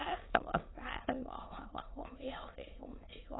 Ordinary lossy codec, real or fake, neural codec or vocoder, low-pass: AAC, 16 kbps; fake; autoencoder, 22.05 kHz, a latent of 192 numbers a frame, VITS, trained on many speakers; 7.2 kHz